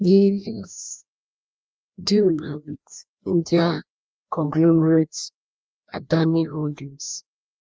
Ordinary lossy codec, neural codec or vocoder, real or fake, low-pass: none; codec, 16 kHz, 1 kbps, FreqCodec, larger model; fake; none